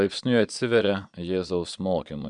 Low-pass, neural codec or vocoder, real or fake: 9.9 kHz; vocoder, 22.05 kHz, 80 mel bands, Vocos; fake